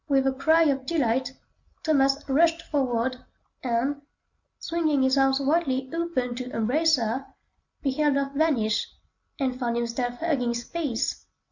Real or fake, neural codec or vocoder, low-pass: real; none; 7.2 kHz